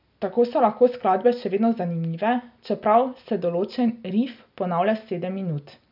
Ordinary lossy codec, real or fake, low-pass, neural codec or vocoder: none; real; 5.4 kHz; none